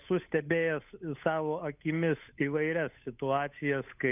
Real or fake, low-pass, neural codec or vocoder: fake; 3.6 kHz; codec, 16 kHz, 8 kbps, FunCodec, trained on Chinese and English, 25 frames a second